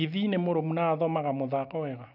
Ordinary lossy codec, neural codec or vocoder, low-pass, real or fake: none; none; 5.4 kHz; real